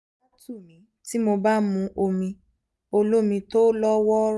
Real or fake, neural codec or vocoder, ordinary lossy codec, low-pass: real; none; none; none